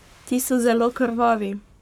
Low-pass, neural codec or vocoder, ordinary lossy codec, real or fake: 19.8 kHz; codec, 44.1 kHz, 7.8 kbps, Pupu-Codec; none; fake